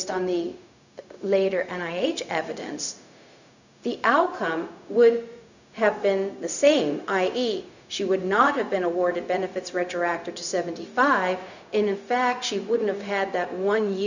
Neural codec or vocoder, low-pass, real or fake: codec, 16 kHz, 0.4 kbps, LongCat-Audio-Codec; 7.2 kHz; fake